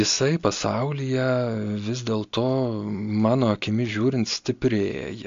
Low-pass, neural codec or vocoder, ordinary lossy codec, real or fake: 7.2 kHz; none; AAC, 64 kbps; real